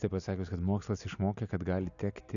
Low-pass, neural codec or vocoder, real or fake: 7.2 kHz; none; real